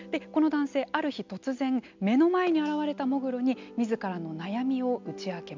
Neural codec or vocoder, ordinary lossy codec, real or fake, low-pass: none; MP3, 64 kbps; real; 7.2 kHz